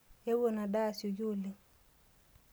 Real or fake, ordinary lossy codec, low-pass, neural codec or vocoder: real; none; none; none